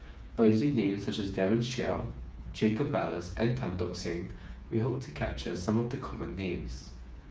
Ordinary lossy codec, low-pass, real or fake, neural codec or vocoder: none; none; fake; codec, 16 kHz, 4 kbps, FreqCodec, smaller model